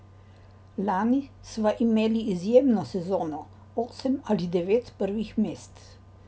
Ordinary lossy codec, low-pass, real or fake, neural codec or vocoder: none; none; real; none